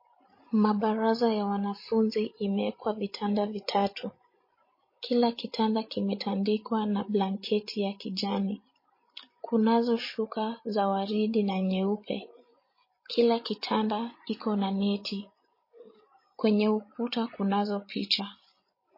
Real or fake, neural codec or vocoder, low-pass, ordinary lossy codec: fake; codec, 16 kHz, 16 kbps, FreqCodec, larger model; 5.4 kHz; MP3, 24 kbps